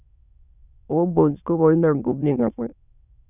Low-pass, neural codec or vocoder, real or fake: 3.6 kHz; autoencoder, 22.05 kHz, a latent of 192 numbers a frame, VITS, trained on many speakers; fake